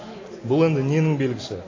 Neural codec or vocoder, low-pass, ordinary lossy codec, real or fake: none; 7.2 kHz; AAC, 32 kbps; real